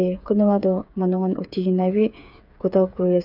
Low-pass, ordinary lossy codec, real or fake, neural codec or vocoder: 5.4 kHz; none; fake; codec, 16 kHz, 8 kbps, FreqCodec, smaller model